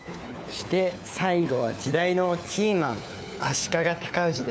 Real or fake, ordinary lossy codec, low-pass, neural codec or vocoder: fake; none; none; codec, 16 kHz, 4 kbps, FunCodec, trained on LibriTTS, 50 frames a second